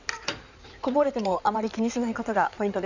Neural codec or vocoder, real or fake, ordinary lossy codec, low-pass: codec, 16 kHz in and 24 kHz out, 2.2 kbps, FireRedTTS-2 codec; fake; none; 7.2 kHz